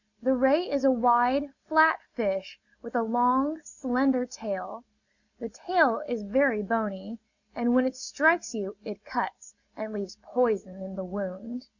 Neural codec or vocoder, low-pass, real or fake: none; 7.2 kHz; real